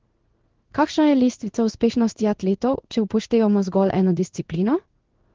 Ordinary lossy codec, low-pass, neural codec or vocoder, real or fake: Opus, 16 kbps; 7.2 kHz; codec, 16 kHz in and 24 kHz out, 1 kbps, XY-Tokenizer; fake